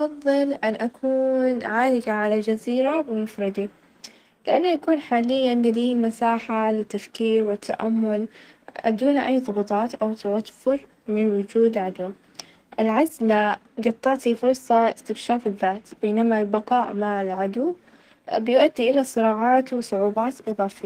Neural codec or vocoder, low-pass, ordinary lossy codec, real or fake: codec, 32 kHz, 1.9 kbps, SNAC; 14.4 kHz; Opus, 24 kbps; fake